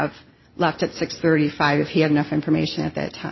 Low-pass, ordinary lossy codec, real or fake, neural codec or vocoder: 7.2 kHz; MP3, 24 kbps; real; none